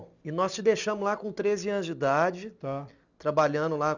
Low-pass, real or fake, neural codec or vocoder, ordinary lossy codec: 7.2 kHz; real; none; none